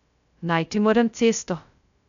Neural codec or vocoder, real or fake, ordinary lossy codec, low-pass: codec, 16 kHz, 0.2 kbps, FocalCodec; fake; none; 7.2 kHz